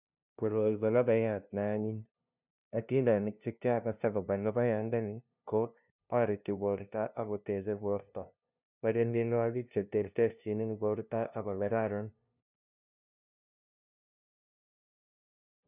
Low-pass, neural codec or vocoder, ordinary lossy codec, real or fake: 3.6 kHz; codec, 16 kHz, 0.5 kbps, FunCodec, trained on LibriTTS, 25 frames a second; none; fake